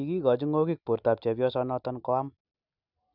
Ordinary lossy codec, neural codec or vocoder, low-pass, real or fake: none; none; 5.4 kHz; real